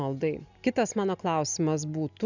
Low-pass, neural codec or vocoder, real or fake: 7.2 kHz; none; real